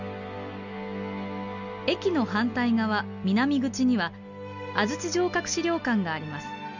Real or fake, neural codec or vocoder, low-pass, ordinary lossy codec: real; none; 7.2 kHz; none